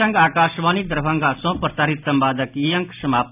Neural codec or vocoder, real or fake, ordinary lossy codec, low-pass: none; real; none; 3.6 kHz